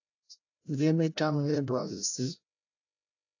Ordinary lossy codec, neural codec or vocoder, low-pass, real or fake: AAC, 48 kbps; codec, 16 kHz, 0.5 kbps, FreqCodec, larger model; 7.2 kHz; fake